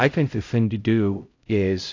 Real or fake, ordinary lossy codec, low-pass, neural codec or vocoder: fake; AAC, 48 kbps; 7.2 kHz; codec, 16 kHz, 0.5 kbps, X-Codec, HuBERT features, trained on LibriSpeech